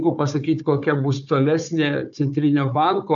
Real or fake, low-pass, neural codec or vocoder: fake; 7.2 kHz; codec, 16 kHz, 4 kbps, FunCodec, trained on Chinese and English, 50 frames a second